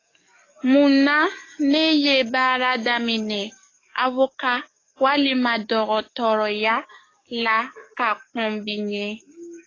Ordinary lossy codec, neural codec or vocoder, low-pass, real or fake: AAC, 32 kbps; codec, 44.1 kHz, 7.8 kbps, DAC; 7.2 kHz; fake